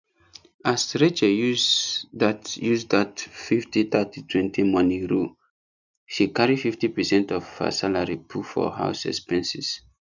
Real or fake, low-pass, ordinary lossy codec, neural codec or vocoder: real; 7.2 kHz; none; none